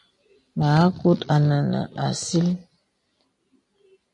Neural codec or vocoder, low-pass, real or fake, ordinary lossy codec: none; 10.8 kHz; real; MP3, 48 kbps